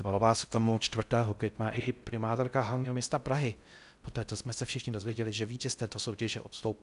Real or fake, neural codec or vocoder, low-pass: fake; codec, 16 kHz in and 24 kHz out, 0.6 kbps, FocalCodec, streaming, 4096 codes; 10.8 kHz